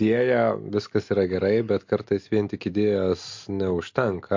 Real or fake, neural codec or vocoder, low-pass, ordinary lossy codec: real; none; 7.2 kHz; MP3, 48 kbps